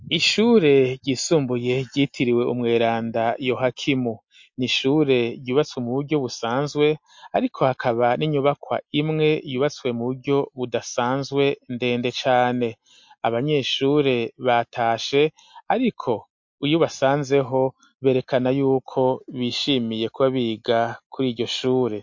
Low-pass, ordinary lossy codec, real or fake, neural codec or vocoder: 7.2 kHz; MP3, 48 kbps; real; none